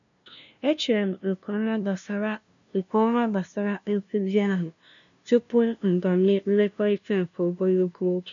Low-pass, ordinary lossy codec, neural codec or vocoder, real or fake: 7.2 kHz; none; codec, 16 kHz, 0.5 kbps, FunCodec, trained on LibriTTS, 25 frames a second; fake